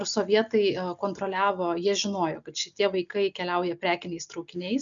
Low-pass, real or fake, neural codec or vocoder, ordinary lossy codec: 7.2 kHz; real; none; MP3, 96 kbps